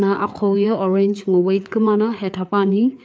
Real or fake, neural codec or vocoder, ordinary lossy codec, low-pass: fake; codec, 16 kHz, 4 kbps, FreqCodec, larger model; none; none